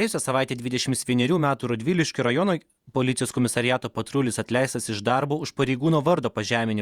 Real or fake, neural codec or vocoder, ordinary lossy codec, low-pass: fake; vocoder, 48 kHz, 128 mel bands, Vocos; Opus, 64 kbps; 19.8 kHz